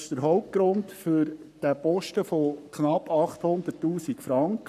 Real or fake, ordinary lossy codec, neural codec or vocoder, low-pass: fake; none; codec, 44.1 kHz, 7.8 kbps, Pupu-Codec; 14.4 kHz